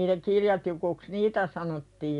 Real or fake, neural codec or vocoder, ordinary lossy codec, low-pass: real; none; none; 10.8 kHz